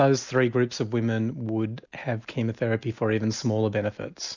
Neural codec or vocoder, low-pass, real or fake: none; 7.2 kHz; real